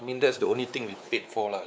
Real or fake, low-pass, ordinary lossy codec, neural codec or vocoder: fake; none; none; codec, 16 kHz, 4 kbps, X-Codec, WavLM features, trained on Multilingual LibriSpeech